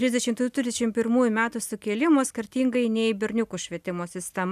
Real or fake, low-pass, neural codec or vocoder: real; 14.4 kHz; none